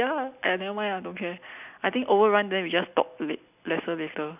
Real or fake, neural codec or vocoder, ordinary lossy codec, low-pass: real; none; none; 3.6 kHz